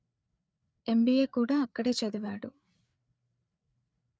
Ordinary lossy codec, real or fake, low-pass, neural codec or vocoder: none; fake; none; codec, 16 kHz, 4 kbps, FreqCodec, larger model